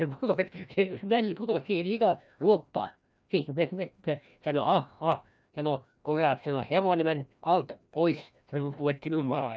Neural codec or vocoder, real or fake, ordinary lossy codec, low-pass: codec, 16 kHz, 1 kbps, FreqCodec, larger model; fake; none; none